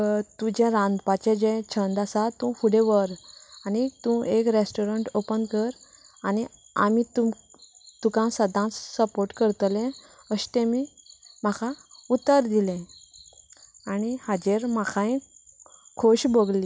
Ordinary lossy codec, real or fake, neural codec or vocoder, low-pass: none; real; none; none